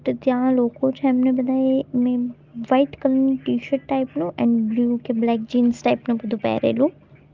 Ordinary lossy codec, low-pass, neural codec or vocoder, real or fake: Opus, 24 kbps; 7.2 kHz; none; real